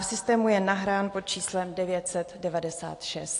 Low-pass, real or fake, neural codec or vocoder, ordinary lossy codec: 10.8 kHz; real; none; AAC, 48 kbps